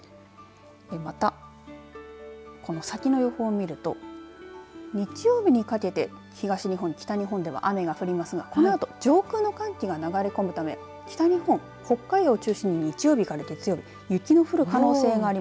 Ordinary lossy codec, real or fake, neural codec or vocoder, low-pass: none; real; none; none